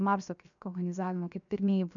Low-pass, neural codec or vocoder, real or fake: 7.2 kHz; codec, 16 kHz, 0.7 kbps, FocalCodec; fake